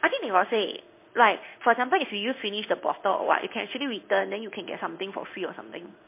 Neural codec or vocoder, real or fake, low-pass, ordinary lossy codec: codec, 16 kHz in and 24 kHz out, 1 kbps, XY-Tokenizer; fake; 3.6 kHz; MP3, 24 kbps